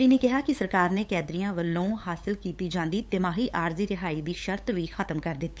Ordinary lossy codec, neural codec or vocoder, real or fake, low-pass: none; codec, 16 kHz, 8 kbps, FunCodec, trained on LibriTTS, 25 frames a second; fake; none